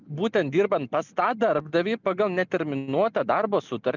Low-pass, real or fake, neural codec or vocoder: 7.2 kHz; real; none